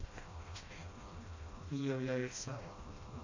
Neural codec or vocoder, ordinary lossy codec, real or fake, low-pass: codec, 16 kHz, 1 kbps, FreqCodec, smaller model; AAC, 48 kbps; fake; 7.2 kHz